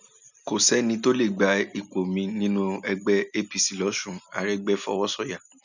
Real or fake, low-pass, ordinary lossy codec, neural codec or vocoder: real; 7.2 kHz; none; none